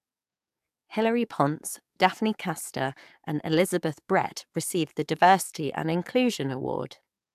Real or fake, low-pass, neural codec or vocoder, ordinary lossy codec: fake; 14.4 kHz; codec, 44.1 kHz, 7.8 kbps, DAC; AAC, 96 kbps